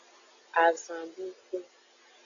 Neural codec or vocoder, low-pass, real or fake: none; 7.2 kHz; real